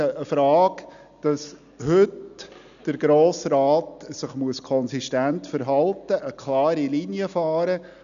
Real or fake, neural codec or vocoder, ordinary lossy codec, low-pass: real; none; MP3, 96 kbps; 7.2 kHz